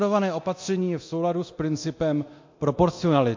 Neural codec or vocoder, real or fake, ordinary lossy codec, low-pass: codec, 24 kHz, 0.9 kbps, DualCodec; fake; MP3, 48 kbps; 7.2 kHz